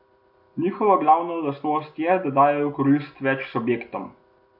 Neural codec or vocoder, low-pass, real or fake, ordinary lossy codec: none; 5.4 kHz; real; AAC, 48 kbps